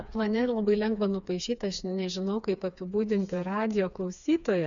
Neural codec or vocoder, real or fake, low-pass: codec, 16 kHz, 4 kbps, FreqCodec, smaller model; fake; 7.2 kHz